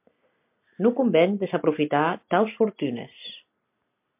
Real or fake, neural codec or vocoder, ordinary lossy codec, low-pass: real; none; MP3, 32 kbps; 3.6 kHz